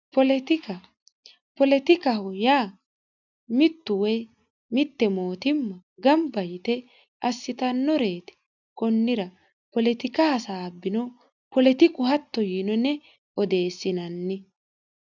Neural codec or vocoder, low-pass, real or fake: none; 7.2 kHz; real